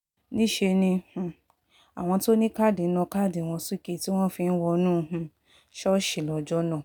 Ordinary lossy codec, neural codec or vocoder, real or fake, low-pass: none; none; real; 19.8 kHz